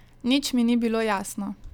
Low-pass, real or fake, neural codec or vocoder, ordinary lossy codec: 19.8 kHz; real; none; none